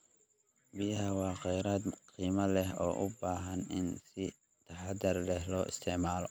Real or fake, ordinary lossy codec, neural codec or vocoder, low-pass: real; none; none; none